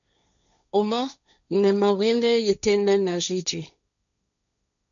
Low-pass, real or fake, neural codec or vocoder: 7.2 kHz; fake; codec, 16 kHz, 1.1 kbps, Voila-Tokenizer